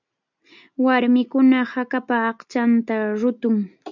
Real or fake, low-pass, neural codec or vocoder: real; 7.2 kHz; none